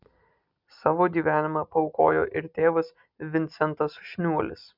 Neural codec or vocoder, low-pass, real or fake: none; 5.4 kHz; real